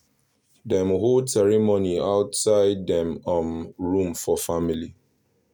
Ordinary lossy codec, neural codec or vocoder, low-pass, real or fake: none; none; none; real